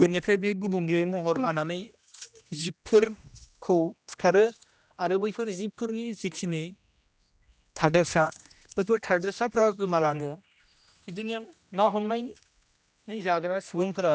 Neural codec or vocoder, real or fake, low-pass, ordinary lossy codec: codec, 16 kHz, 1 kbps, X-Codec, HuBERT features, trained on general audio; fake; none; none